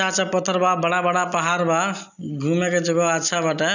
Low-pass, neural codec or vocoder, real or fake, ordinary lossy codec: 7.2 kHz; none; real; none